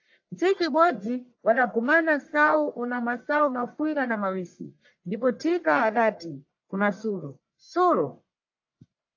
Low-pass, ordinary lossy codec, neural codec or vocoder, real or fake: 7.2 kHz; AAC, 48 kbps; codec, 44.1 kHz, 1.7 kbps, Pupu-Codec; fake